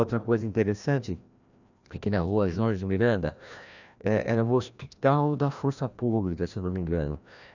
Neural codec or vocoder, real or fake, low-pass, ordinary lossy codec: codec, 16 kHz, 1 kbps, FreqCodec, larger model; fake; 7.2 kHz; none